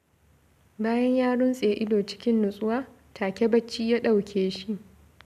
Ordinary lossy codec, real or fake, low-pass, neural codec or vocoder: none; real; 14.4 kHz; none